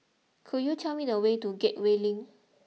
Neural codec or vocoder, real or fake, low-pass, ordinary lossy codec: none; real; none; none